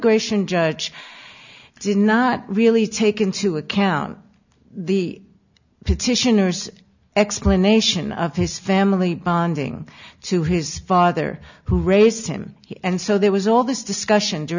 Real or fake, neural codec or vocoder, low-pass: real; none; 7.2 kHz